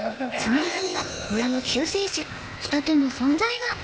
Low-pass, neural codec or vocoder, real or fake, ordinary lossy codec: none; codec, 16 kHz, 0.8 kbps, ZipCodec; fake; none